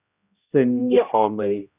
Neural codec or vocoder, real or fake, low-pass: codec, 16 kHz, 0.5 kbps, X-Codec, HuBERT features, trained on general audio; fake; 3.6 kHz